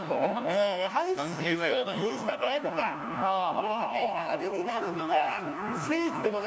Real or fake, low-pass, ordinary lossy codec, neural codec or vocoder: fake; none; none; codec, 16 kHz, 1 kbps, FunCodec, trained on LibriTTS, 50 frames a second